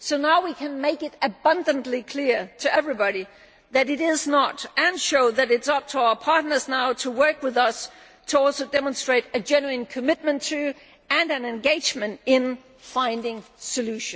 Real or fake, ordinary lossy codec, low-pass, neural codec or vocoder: real; none; none; none